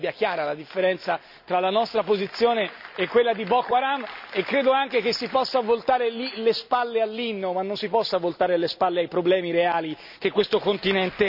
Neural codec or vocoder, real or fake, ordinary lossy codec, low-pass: none; real; none; 5.4 kHz